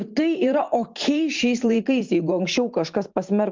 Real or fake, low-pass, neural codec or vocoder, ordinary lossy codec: fake; 7.2 kHz; vocoder, 44.1 kHz, 128 mel bands every 512 samples, BigVGAN v2; Opus, 32 kbps